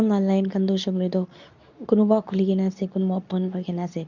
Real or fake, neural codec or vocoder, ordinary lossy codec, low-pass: fake; codec, 24 kHz, 0.9 kbps, WavTokenizer, medium speech release version 2; none; 7.2 kHz